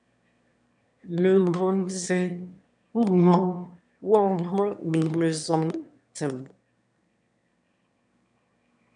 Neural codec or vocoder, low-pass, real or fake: autoencoder, 22.05 kHz, a latent of 192 numbers a frame, VITS, trained on one speaker; 9.9 kHz; fake